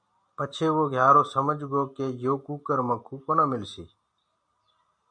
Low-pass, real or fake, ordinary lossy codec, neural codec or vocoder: 9.9 kHz; real; MP3, 64 kbps; none